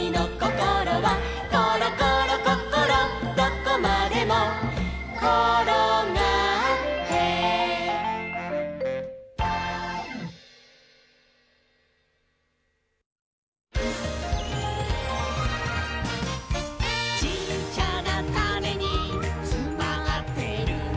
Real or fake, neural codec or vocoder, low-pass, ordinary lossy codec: real; none; none; none